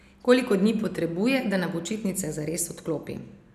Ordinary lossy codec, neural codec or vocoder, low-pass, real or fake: none; none; 14.4 kHz; real